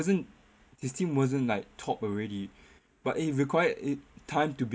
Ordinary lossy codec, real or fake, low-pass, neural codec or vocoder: none; real; none; none